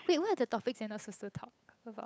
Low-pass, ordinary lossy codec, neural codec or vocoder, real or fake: none; none; codec, 16 kHz, 8 kbps, FunCodec, trained on Chinese and English, 25 frames a second; fake